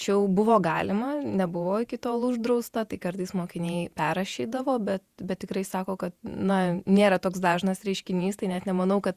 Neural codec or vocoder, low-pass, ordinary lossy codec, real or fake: vocoder, 48 kHz, 128 mel bands, Vocos; 14.4 kHz; Opus, 64 kbps; fake